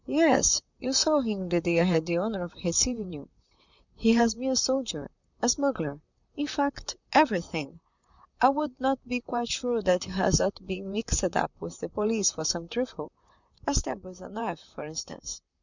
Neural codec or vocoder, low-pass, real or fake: vocoder, 44.1 kHz, 128 mel bands, Pupu-Vocoder; 7.2 kHz; fake